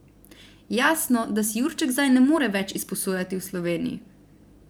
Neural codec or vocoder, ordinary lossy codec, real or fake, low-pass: vocoder, 44.1 kHz, 128 mel bands every 512 samples, BigVGAN v2; none; fake; none